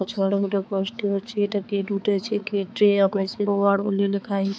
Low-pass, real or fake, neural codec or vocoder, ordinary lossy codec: none; fake; codec, 16 kHz, 4 kbps, X-Codec, HuBERT features, trained on balanced general audio; none